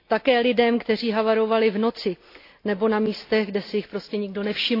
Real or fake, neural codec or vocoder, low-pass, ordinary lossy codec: real; none; 5.4 kHz; AAC, 32 kbps